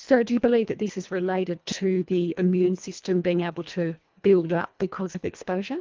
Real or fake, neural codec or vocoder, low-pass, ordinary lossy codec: fake; codec, 24 kHz, 1.5 kbps, HILCodec; 7.2 kHz; Opus, 32 kbps